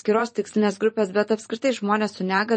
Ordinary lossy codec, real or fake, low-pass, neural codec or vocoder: MP3, 32 kbps; fake; 10.8 kHz; vocoder, 44.1 kHz, 128 mel bands every 512 samples, BigVGAN v2